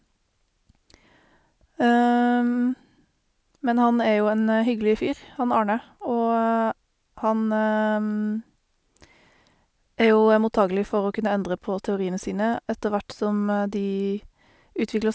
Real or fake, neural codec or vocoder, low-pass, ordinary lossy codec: real; none; none; none